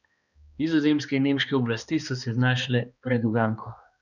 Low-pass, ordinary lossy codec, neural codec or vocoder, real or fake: 7.2 kHz; none; codec, 16 kHz, 2 kbps, X-Codec, HuBERT features, trained on balanced general audio; fake